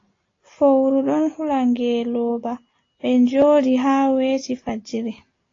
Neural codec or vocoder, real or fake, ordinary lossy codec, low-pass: none; real; AAC, 32 kbps; 7.2 kHz